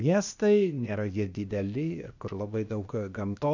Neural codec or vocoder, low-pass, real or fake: codec, 16 kHz, 0.8 kbps, ZipCodec; 7.2 kHz; fake